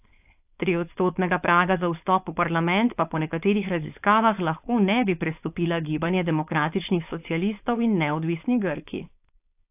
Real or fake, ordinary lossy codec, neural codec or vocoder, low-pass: fake; AAC, 32 kbps; codec, 16 kHz, 4.8 kbps, FACodec; 3.6 kHz